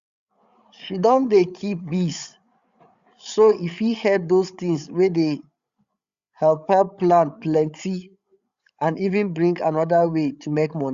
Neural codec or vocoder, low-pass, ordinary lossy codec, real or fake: codec, 16 kHz, 8 kbps, FreqCodec, larger model; 7.2 kHz; Opus, 64 kbps; fake